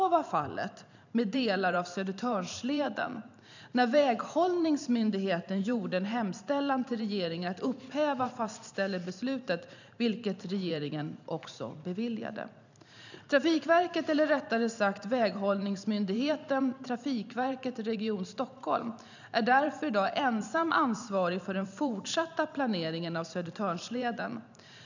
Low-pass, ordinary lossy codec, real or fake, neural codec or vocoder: 7.2 kHz; none; fake; vocoder, 44.1 kHz, 128 mel bands every 512 samples, BigVGAN v2